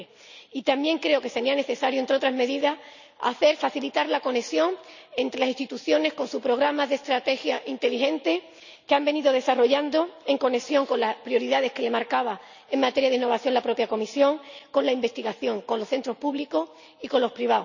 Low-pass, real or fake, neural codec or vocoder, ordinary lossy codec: 7.2 kHz; real; none; none